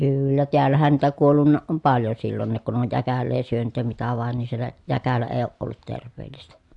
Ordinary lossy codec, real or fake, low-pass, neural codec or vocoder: AAC, 64 kbps; real; 10.8 kHz; none